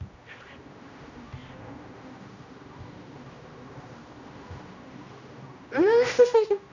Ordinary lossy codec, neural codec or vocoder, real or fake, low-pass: none; codec, 16 kHz, 1 kbps, X-Codec, HuBERT features, trained on general audio; fake; 7.2 kHz